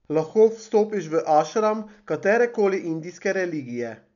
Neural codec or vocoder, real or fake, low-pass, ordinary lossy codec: none; real; 7.2 kHz; none